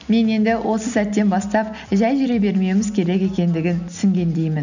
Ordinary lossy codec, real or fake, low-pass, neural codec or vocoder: none; real; 7.2 kHz; none